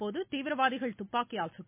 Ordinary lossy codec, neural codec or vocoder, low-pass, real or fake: MP3, 24 kbps; none; 3.6 kHz; real